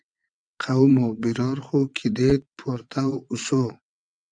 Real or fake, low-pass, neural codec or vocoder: fake; 9.9 kHz; vocoder, 22.05 kHz, 80 mel bands, WaveNeXt